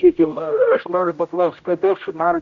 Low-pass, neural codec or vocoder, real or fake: 7.2 kHz; codec, 16 kHz, 0.5 kbps, X-Codec, HuBERT features, trained on general audio; fake